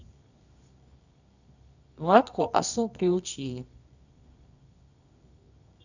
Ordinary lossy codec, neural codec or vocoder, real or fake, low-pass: none; codec, 24 kHz, 0.9 kbps, WavTokenizer, medium music audio release; fake; 7.2 kHz